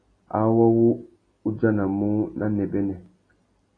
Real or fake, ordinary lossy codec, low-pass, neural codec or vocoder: real; AAC, 32 kbps; 9.9 kHz; none